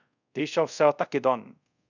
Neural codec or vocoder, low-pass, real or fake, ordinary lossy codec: codec, 24 kHz, 0.5 kbps, DualCodec; 7.2 kHz; fake; none